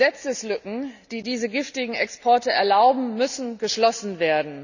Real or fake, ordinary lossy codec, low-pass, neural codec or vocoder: real; none; 7.2 kHz; none